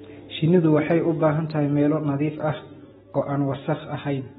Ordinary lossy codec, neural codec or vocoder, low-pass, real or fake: AAC, 16 kbps; none; 7.2 kHz; real